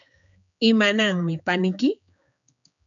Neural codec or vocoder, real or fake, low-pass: codec, 16 kHz, 4 kbps, X-Codec, HuBERT features, trained on general audio; fake; 7.2 kHz